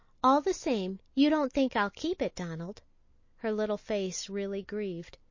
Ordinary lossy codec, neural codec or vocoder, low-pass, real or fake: MP3, 32 kbps; none; 7.2 kHz; real